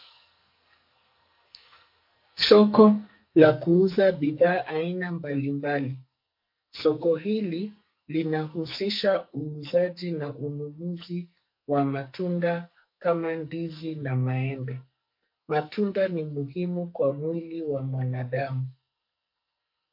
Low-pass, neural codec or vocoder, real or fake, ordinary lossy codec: 5.4 kHz; codec, 44.1 kHz, 2.6 kbps, SNAC; fake; MP3, 32 kbps